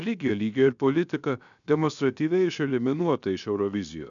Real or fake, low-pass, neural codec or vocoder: fake; 7.2 kHz; codec, 16 kHz, 0.7 kbps, FocalCodec